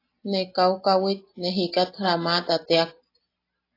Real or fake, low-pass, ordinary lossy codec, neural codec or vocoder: real; 5.4 kHz; AAC, 32 kbps; none